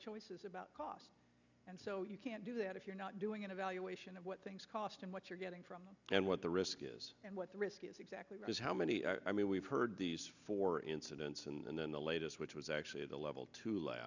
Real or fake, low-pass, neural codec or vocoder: real; 7.2 kHz; none